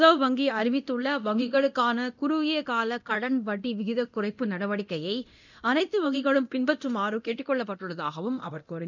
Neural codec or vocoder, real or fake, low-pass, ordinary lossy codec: codec, 24 kHz, 0.9 kbps, DualCodec; fake; 7.2 kHz; none